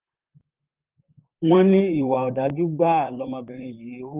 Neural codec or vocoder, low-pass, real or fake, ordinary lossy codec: vocoder, 44.1 kHz, 128 mel bands, Pupu-Vocoder; 3.6 kHz; fake; Opus, 24 kbps